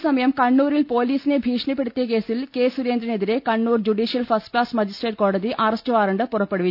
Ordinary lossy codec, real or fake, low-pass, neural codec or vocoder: none; real; 5.4 kHz; none